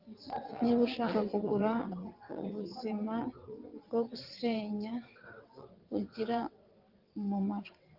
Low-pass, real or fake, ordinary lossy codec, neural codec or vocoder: 5.4 kHz; fake; Opus, 32 kbps; vocoder, 22.05 kHz, 80 mel bands, WaveNeXt